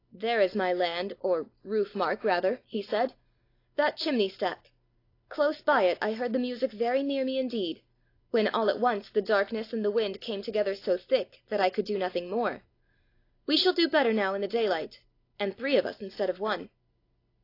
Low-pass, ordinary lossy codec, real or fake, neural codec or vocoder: 5.4 kHz; AAC, 32 kbps; real; none